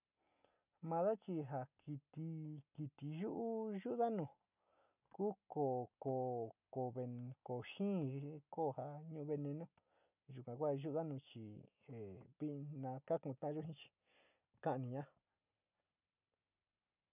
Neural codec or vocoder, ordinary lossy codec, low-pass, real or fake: none; none; 3.6 kHz; real